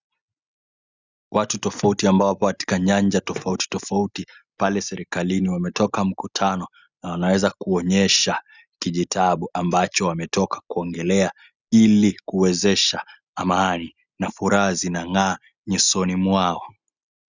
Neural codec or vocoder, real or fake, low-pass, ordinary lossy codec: none; real; 7.2 kHz; Opus, 64 kbps